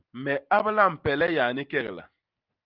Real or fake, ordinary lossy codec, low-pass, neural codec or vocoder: real; Opus, 24 kbps; 5.4 kHz; none